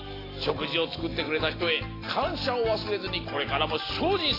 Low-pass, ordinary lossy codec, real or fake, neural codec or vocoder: 5.4 kHz; AAC, 24 kbps; real; none